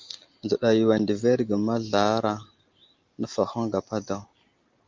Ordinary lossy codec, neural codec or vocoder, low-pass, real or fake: Opus, 24 kbps; none; 7.2 kHz; real